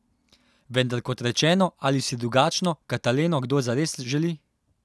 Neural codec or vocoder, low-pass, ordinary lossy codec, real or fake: none; none; none; real